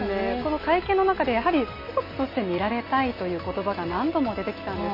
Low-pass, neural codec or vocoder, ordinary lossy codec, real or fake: 5.4 kHz; none; MP3, 24 kbps; real